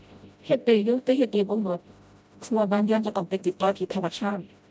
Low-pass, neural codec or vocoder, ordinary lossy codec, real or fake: none; codec, 16 kHz, 0.5 kbps, FreqCodec, smaller model; none; fake